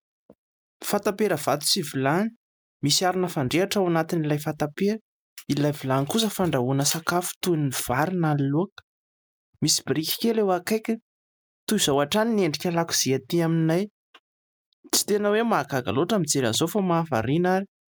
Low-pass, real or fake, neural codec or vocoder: 19.8 kHz; real; none